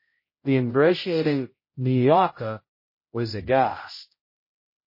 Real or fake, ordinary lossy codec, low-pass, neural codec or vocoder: fake; MP3, 24 kbps; 5.4 kHz; codec, 16 kHz, 0.5 kbps, X-Codec, HuBERT features, trained on general audio